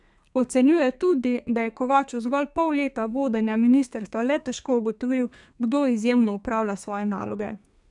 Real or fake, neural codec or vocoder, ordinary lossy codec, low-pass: fake; codec, 32 kHz, 1.9 kbps, SNAC; none; 10.8 kHz